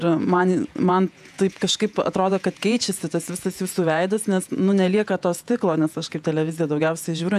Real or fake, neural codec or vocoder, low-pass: fake; vocoder, 48 kHz, 128 mel bands, Vocos; 14.4 kHz